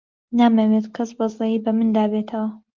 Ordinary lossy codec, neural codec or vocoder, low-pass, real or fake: Opus, 32 kbps; none; 7.2 kHz; real